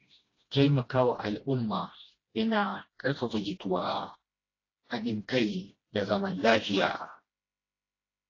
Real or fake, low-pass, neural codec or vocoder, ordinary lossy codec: fake; 7.2 kHz; codec, 16 kHz, 1 kbps, FreqCodec, smaller model; AAC, 32 kbps